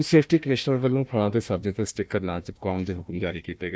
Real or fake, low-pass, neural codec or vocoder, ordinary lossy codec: fake; none; codec, 16 kHz, 1 kbps, FunCodec, trained on Chinese and English, 50 frames a second; none